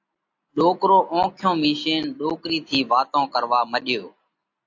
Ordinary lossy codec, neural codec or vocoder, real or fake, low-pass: AAC, 48 kbps; none; real; 7.2 kHz